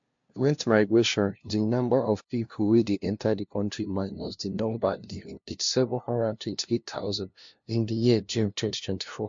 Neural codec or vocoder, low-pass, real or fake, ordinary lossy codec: codec, 16 kHz, 0.5 kbps, FunCodec, trained on LibriTTS, 25 frames a second; 7.2 kHz; fake; MP3, 48 kbps